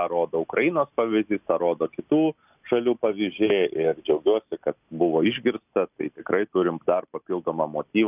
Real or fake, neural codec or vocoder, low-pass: real; none; 3.6 kHz